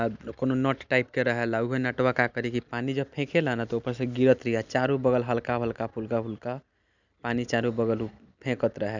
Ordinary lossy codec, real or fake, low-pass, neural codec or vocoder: none; real; 7.2 kHz; none